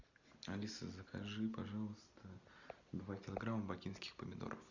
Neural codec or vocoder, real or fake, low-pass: none; real; 7.2 kHz